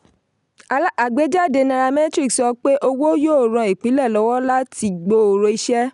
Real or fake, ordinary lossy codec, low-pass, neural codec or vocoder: real; none; 10.8 kHz; none